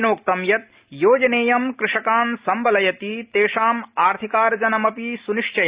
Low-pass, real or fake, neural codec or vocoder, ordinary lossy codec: 3.6 kHz; real; none; Opus, 64 kbps